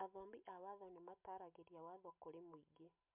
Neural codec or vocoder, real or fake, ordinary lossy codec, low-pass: none; real; MP3, 32 kbps; 3.6 kHz